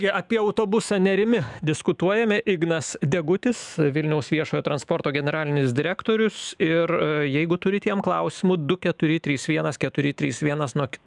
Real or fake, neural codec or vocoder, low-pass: fake; autoencoder, 48 kHz, 128 numbers a frame, DAC-VAE, trained on Japanese speech; 10.8 kHz